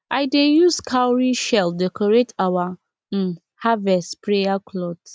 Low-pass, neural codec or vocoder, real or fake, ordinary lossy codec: none; none; real; none